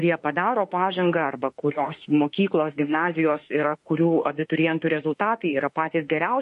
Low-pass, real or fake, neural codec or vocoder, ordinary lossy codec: 14.4 kHz; fake; autoencoder, 48 kHz, 32 numbers a frame, DAC-VAE, trained on Japanese speech; MP3, 48 kbps